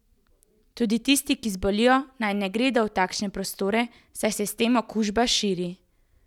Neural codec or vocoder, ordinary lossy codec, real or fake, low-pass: none; none; real; 19.8 kHz